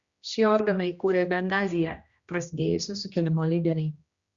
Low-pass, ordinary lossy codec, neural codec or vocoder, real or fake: 7.2 kHz; Opus, 64 kbps; codec, 16 kHz, 1 kbps, X-Codec, HuBERT features, trained on general audio; fake